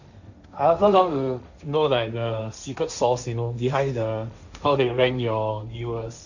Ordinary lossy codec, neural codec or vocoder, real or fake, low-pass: none; codec, 16 kHz, 1.1 kbps, Voila-Tokenizer; fake; 7.2 kHz